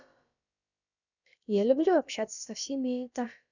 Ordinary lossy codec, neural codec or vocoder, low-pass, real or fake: none; codec, 16 kHz, about 1 kbps, DyCAST, with the encoder's durations; 7.2 kHz; fake